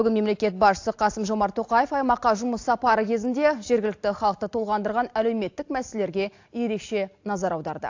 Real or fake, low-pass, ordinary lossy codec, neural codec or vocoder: real; 7.2 kHz; AAC, 48 kbps; none